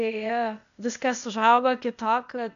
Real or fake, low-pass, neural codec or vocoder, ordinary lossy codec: fake; 7.2 kHz; codec, 16 kHz, 0.8 kbps, ZipCodec; MP3, 96 kbps